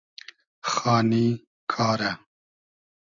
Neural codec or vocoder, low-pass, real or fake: none; 7.2 kHz; real